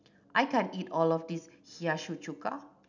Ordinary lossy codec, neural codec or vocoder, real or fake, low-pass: none; none; real; 7.2 kHz